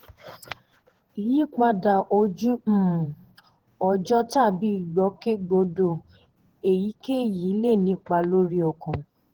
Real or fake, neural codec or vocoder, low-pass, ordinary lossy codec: fake; vocoder, 48 kHz, 128 mel bands, Vocos; 19.8 kHz; Opus, 16 kbps